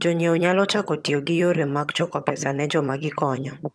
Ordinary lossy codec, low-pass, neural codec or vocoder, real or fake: none; none; vocoder, 22.05 kHz, 80 mel bands, HiFi-GAN; fake